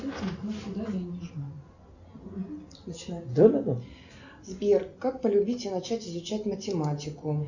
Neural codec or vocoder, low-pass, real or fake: none; 7.2 kHz; real